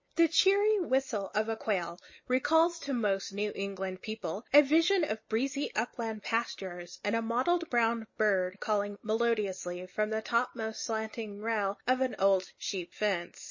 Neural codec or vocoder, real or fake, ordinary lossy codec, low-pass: none; real; MP3, 32 kbps; 7.2 kHz